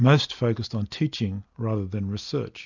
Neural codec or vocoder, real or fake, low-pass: none; real; 7.2 kHz